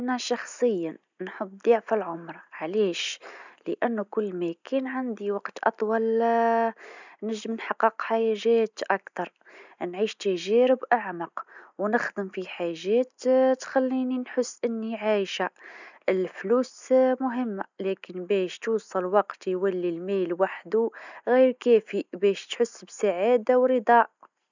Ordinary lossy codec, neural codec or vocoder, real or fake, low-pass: none; none; real; 7.2 kHz